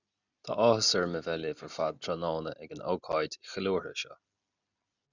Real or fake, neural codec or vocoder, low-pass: real; none; 7.2 kHz